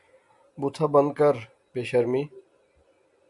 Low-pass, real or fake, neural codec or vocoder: 10.8 kHz; real; none